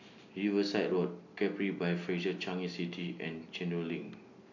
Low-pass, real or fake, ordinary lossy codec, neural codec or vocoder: 7.2 kHz; real; none; none